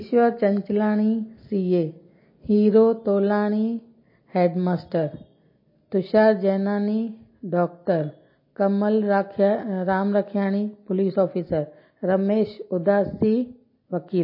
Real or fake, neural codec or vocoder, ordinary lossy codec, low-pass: real; none; MP3, 24 kbps; 5.4 kHz